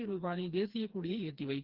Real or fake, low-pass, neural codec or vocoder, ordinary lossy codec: fake; 5.4 kHz; codec, 16 kHz, 2 kbps, FreqCodec, smaller model; Opus, 16 kbps